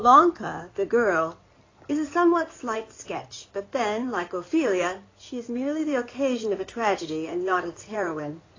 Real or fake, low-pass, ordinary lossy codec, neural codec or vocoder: fake; 7.2 kHz; AAC, 32 kbps; codec, 16 kHz in and 24 kHz out, 2.2 kbps, FireRedTTS-2 codec